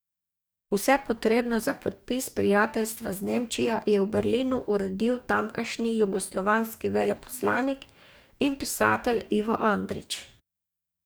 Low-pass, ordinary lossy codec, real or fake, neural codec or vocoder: none; none; fake; codec, 44.1 kHz, 2.6 kbps, DAC